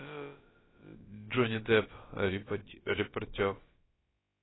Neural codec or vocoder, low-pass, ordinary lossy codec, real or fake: codec, 16 kHz, about 1 kbps, DyCAST, with the encoder's durations; 7.2 kHz; AAC, 16 kbps; fake